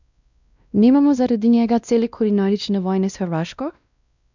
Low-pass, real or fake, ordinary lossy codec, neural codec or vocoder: 7.2 kHz; fake; none; codec, 16 kHz, 1 kbps, X-Codec, WavLM features, trained on Multilingual LibriSpeech